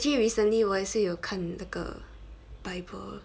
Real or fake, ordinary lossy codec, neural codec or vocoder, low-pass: real; none; none; none